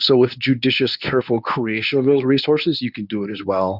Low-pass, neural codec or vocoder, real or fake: 5.4 kHz; codec, 24 kHz, 0.9 kbps, WavTokenizer, medium speech release version 1; fake